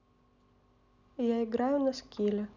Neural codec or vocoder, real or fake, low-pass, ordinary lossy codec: none; real; 7.2 kHz; none